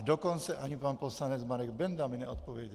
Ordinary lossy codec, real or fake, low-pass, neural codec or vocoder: Opus, 24 kbps; real; 14.4 kHz; none